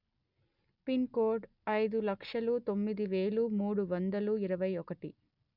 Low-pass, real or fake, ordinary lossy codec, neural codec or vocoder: 5.4 kHz; real; none; none